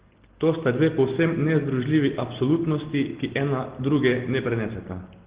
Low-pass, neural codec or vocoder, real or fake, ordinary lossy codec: 3.6 kHz; none; real; Opus, 16 kbps